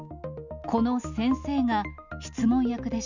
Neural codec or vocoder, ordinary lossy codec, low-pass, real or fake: none; none; 7.2 kHz; real